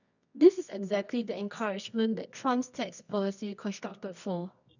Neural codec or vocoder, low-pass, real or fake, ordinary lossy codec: codec, 24 kHz, 0.9 kbps, WavTokenizer, medium music audio release; 7.2 kHz; fake; none